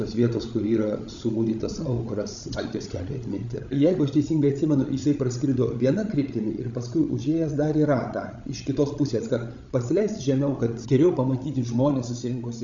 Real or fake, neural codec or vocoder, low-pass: fake; codec, 16 kHz, 16 kbps, FreqCodec, larger model; 7.2 kHz